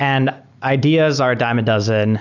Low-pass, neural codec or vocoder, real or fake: 7.2 kHz; none; real